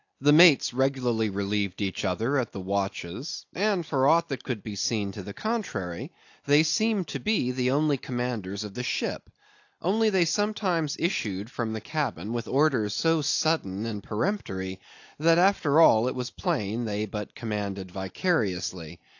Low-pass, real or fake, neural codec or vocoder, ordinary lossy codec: 7.2 kHz; real; none; AAC, 48 kbps